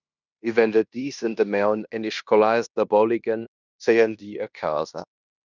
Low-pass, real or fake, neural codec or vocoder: 7.2 kHz; fake; codec, 16 kHz in and 24 kHz out, 0.9 kbps, LongCat-Audio-Codec, fine tuned four codebook decoder